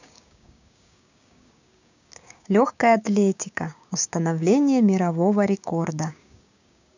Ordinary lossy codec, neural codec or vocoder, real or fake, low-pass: none; codec, 16 kHz, 6 kbps, DAC; fake; 7.2 kHz